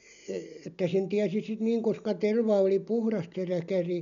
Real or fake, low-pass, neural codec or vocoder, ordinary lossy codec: real; 7.2 kHz; none; none